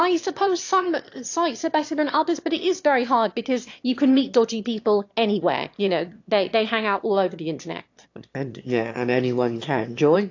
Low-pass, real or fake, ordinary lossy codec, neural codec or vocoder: 7.2 kHz; fake; AAC, 48 kbps; autoencoder, 22.05 kHz, a latent of 192 numbers a frame, VITS, trained on one speaker